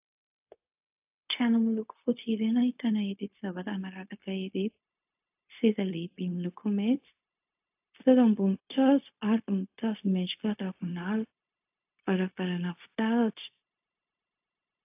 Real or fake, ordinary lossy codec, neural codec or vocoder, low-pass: fake; AAC, 32 kbps; codec, 16 kHz, 0.4 kbps, LongCat-Audio-Codec; 3.6 kHz